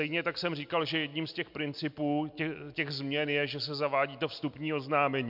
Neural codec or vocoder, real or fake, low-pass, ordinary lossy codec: none; real; 5.4 kHz; MP3, 48 kbps